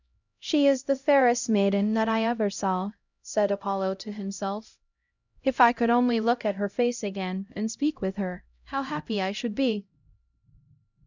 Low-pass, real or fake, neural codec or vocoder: 7.2 kHz; fake; codec, 16 kHz, 0.5 kbps, X-Codec, HuBERT features, trained on LibriSpeech